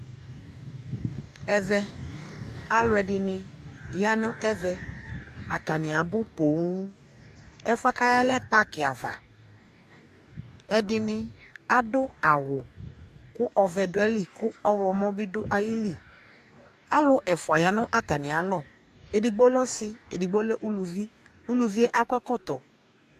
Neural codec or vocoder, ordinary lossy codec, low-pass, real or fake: codec, 44.1 kHz, 2.6 kbps, DAC; AAC, 96 kbps; 14.4 kHz; fake